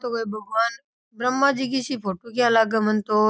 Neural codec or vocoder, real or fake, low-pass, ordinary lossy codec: none; real; none; none